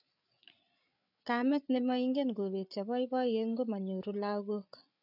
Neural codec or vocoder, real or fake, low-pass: codec, 16 kHz, 8 kbps, FreqCodec, larger model; fake; 5.4 kHz